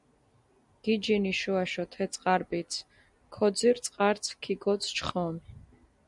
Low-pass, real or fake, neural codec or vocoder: 10.8 kHz; real; none